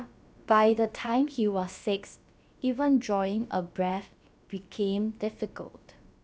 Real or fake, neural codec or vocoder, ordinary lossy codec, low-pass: fake; codec, 16 kHz, about 1 kbps, DyCAST, with the encoder's durations; none; none